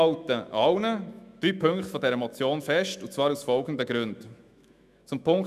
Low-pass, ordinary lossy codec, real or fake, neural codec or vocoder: 14.4 kHz; none; fake; autoencoder, 48 kHz, 128 numbers a frame, DAC-VAE, trained on Japanese speech